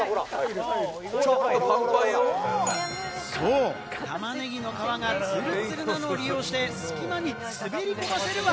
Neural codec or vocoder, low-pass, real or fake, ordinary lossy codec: none; none; real; none